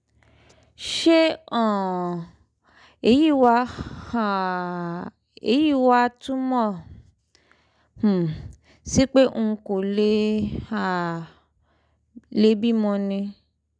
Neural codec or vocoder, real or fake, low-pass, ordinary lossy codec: none; real; 9.9 kHz; none